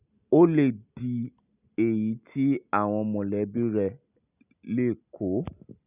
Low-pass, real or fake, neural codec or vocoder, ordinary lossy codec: 3.6 kHz; real; none; none